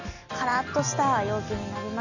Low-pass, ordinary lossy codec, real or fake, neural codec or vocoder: 7.2 kHz; none; real; none